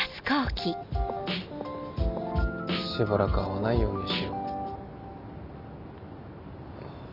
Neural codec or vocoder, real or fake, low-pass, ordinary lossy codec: none; real; 5.4 kHz; none